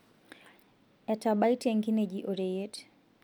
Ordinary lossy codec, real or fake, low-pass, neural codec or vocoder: MP3, 96 kbps; real; 19.8 kHz; none